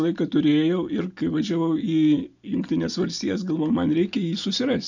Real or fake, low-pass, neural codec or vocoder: real; 7.2 kHz; none